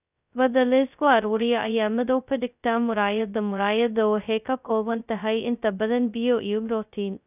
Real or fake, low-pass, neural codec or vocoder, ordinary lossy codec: fake; 3.6 kHz; codec, 16 kHz, 0.2 kbps, FocalCodec; none